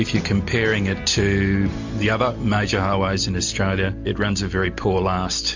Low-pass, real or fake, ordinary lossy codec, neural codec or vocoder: 7.2 kHz; real; MP3, 48 kbps; none